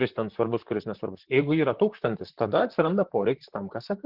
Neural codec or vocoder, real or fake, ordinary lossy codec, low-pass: vocoder, 44.1 kHz, 128 mel bands, Pupu-Vocoder; fake; Opus, 16 kbps; 5.4 kHz